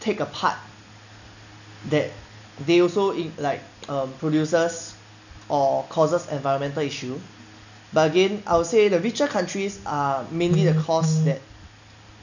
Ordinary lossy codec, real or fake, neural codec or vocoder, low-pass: none; real; none; 7.2 kHz